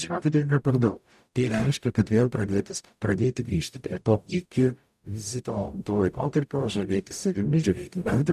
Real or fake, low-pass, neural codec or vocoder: fake; 14.4 kHz; codec, 44.1 kHz, 0.9 kbps, DAC